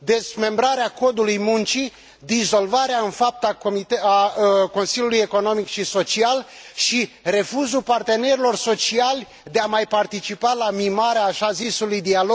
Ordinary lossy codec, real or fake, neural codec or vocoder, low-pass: none; real; none; none